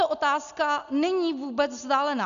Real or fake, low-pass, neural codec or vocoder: real; 7.2 kHz; none